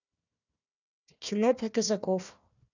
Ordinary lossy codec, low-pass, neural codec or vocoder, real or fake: none; 7.2 kHz; codec, 16 kHz, 1 kbps, FunCodec, trained on Chinese and English, 50 frames a second; fake